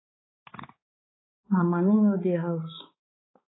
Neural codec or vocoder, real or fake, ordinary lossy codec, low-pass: codec, 16 kHz, 4 kbps, X-Codec, HuBERT features, trained on balanced general audio; fake; AAC, 16 kbps; 7.2 kHz